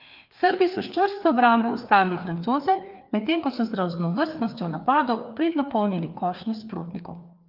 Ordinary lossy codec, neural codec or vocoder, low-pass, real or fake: Opus, 24 kbps; codec, 16 kHz, 2 kbps, FreqCodec, larger model; 5.4 kHz; fake